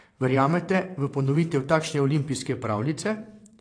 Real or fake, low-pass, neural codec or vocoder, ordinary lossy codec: fake; 9.9 kHz; vocoder, 22.05 kHz, 80 mel bands, WaveNeXt; AAC, 48 kbps